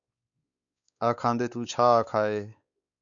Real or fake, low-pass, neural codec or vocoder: fake; 7.2 kHz; codec, 16 kHz, 4 kbps, X-Codec, WavLM features, trained on Multilingual LibriSpeech